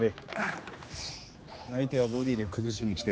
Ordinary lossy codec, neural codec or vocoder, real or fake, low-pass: none; codec, 16 kHz, 2 kbps, X-Codec, HuBERT features, trained on general audio; fake; none